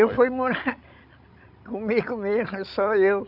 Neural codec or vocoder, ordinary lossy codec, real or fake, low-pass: codec, 16 kHz, 16 kbps, FreqCodec, larger model; none; fake; 5.4 kHz